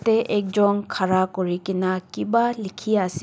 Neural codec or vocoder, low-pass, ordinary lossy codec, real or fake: none; none; none; real